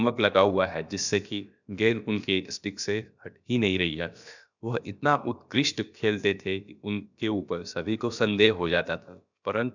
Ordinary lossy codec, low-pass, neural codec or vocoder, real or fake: none; 7.2 kHz; codec, 16 kHz, about 1 kbps, DyCAST, with the encoder's durations; fake